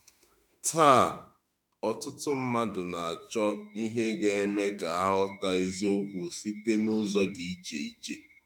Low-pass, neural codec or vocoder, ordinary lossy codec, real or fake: 19.8 kHz; autoencoder, 48 kHz, 32 numbers a frame, DAC-VAE, trained on Japanese speech; none; fake